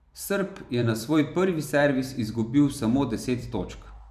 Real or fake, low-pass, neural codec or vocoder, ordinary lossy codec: fake; 14.4 kHz; vocoder, 44.1 kHz, 128 mel bands every 512 samples, BigVGAN v2; none